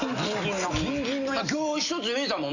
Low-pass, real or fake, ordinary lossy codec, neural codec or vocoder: 7.2 kHz; real; none; none